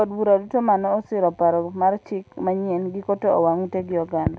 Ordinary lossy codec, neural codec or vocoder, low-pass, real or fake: none; none; none; real